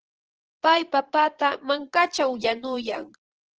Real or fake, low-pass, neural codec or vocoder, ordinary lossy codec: fake; 7.2 kHz; vocoder, 22.05 kHz, 80 mel bands, Vocos; Opus, 16 kbps